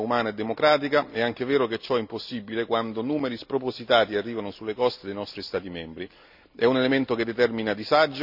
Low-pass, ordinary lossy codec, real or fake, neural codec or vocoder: 5.4 kHz; none; real; none